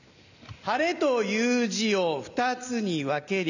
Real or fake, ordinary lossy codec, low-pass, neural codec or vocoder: real; none; 7.2 kHz; none